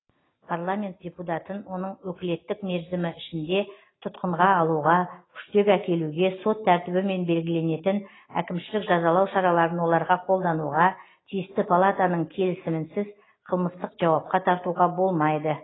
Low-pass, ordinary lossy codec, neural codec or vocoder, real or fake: 7.2 kHz; AAC, 16 kbps; none; real